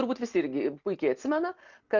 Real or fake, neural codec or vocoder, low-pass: real; none; 7.2 kHz